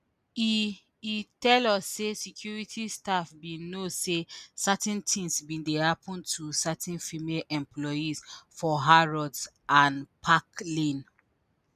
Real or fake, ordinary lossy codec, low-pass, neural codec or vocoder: real; none; 14.4 kHz; none